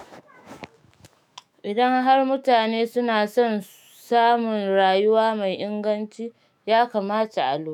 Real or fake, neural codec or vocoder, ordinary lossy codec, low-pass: fake; autoencoder, 48 kHz, 128 numbers a frame, DAC-VAE, trained on Japanese speech; none; 19.8 kHz